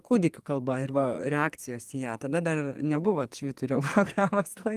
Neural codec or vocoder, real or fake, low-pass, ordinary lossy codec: codec, 44.1 kHz, 2.6 kbps, SNAC; fake; 14.4 kHz; Opus, 32 kbps